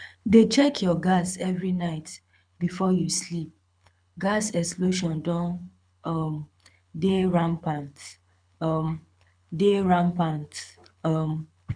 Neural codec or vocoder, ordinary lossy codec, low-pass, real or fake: codec, 24 kHz, 6 kbps, HILCodec; none; 9.9 kHz; fake